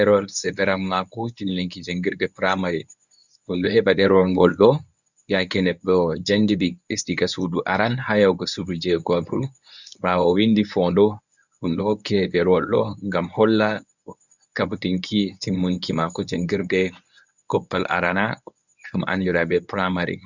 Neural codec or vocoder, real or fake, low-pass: codec, 24 kHz, 0.9 kbps, WavTokenizer, medium speech release version 1; fake; 7.2 kHz